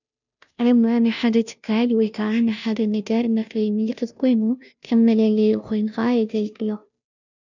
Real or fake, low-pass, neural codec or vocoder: fake; 7.2 kHz; codec, 16 kHz, 0.5 kbps, FunCodec, trained on Chinese and English, 25 frames a second